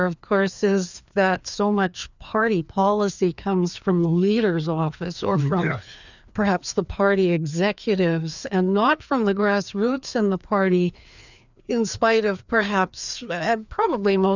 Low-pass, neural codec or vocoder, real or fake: 7.2 kHz; codec, 16 kHz, 2 kbps, FreqCodec, larger model; fake